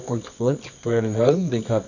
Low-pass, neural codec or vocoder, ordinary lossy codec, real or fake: 7.2 kHz; codec, 24 kHz, 0.9 kbps, WavTokenizer, medium music audio release; none; fake